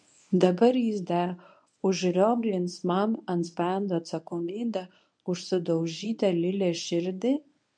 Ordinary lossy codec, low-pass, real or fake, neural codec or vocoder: MP3, 64 kbps; 9.9 kHz; fake; codec, 24 kHz, 0.9 kbps, WavTokenizer, medium speech release version 1